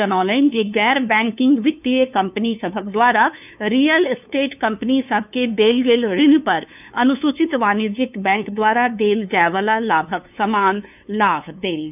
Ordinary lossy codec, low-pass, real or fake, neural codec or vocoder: none; 3.6 kHz; fake; codec, 16 kHz, 2 kbps, FunCodec, trained on LibriTTS, 25 frames a second